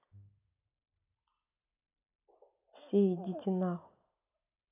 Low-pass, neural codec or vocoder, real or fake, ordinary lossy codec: 3.6 kHz; none; real; none